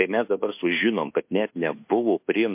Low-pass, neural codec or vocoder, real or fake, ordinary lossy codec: 3.6 kHz; codec, 24 kHz, 1.2 kbps, DualCodec; fake; MP3, 32 kbps